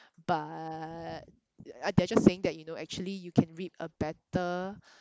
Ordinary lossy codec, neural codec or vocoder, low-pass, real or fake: none; none; none; real